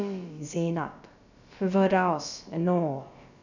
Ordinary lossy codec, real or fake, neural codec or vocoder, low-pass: none; fake; codec, 16 kHz, about 1 kbps, DyCAST, with the encoder's durations; 7.2 kHz